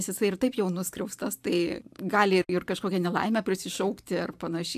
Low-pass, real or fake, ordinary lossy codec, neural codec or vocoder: 14.4 kHz; fake; AAC, 96 kbps; vocoder, 44.1 kHz, 128 mel bands every 512 samples, BigVGAN v2